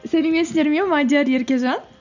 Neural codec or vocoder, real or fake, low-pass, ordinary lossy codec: none; real; 7.2 kHz; none